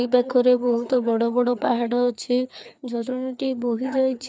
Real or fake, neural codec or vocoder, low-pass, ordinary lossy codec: fake; codec, 16 kHz, 4 kbps, FreqCodec, larger model; none; none